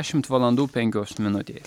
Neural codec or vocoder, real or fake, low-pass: none; real; 19.8 kHz